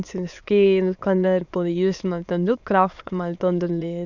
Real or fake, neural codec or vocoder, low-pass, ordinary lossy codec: fake; autoencoder, 22.05 kHz, a latent of 192 numbers a frame, VITS, trained on many speakers; 7.2 kHz; none